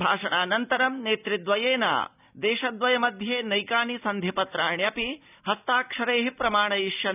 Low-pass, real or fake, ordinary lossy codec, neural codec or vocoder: 3.6 kHz; real; none; none